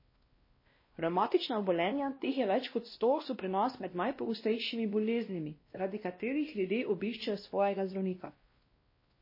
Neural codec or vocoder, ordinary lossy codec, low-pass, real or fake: codec, 16 kHz, 1 kbps, X-Codec, WavLM features, trained on Multilingual LibriSpeech; MP3, 24 kbps; 5.4 kHz; fake